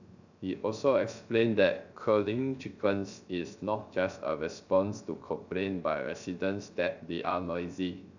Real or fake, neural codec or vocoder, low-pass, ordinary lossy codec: fake; codec, 16 kHz, 0.3 kbps, FocalCodec; 7.2 kHz; none